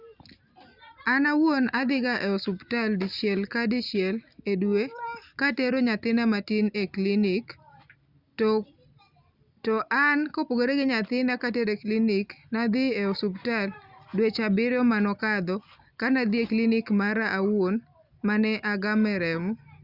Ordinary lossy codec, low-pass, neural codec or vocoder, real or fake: Opus, 64 kbps; 5.4 kHz; none; real